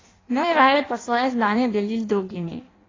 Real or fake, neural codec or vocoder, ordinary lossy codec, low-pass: fake; codec, 16 kHz in and 24 kHz out, 0.6 kbps, FireRedTTS-2 codec; AAC, 32 kbps; 7.2 kHz